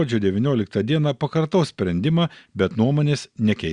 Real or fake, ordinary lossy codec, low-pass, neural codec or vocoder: real; Opus, 64 kbps; 9.9 kHz; none